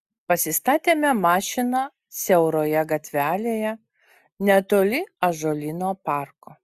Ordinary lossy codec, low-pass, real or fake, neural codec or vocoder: Opus, 64 kbps; 14.4 kHz; real; none